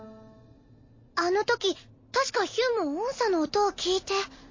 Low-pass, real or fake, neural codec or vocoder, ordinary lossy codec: 7.2 kHz; real; none; MP3, 32 kbps